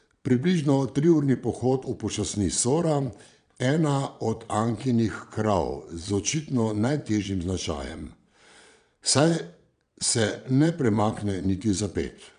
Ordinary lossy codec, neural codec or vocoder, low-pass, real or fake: none; vocoder, 22.05 kHz, 80 mel bands, Vocos; 9.9 kHz; fake